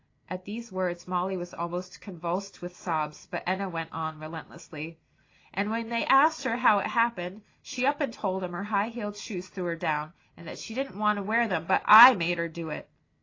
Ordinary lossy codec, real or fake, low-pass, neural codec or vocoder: AAC, 32 kbps; real; 7.2 kHz; none